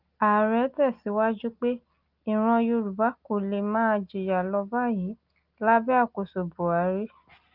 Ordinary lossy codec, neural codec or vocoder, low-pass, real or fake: Opus, 32 kbps; none; 5.4 kHz; real